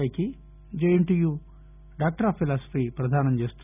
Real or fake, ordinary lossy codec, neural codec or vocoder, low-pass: real; none; none; 3.6 kHz